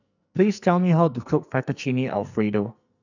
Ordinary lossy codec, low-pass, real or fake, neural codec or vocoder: none; 7.2 kHz; fake; codec, 44.1 kHz, 2.6 kbps, SNAC